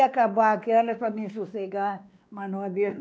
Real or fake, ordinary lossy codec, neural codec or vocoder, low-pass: fake; none; codec, 16 kHz, 2 kbps, X-Codec, WavLM features, trained on Multilingual LibriSpeech; none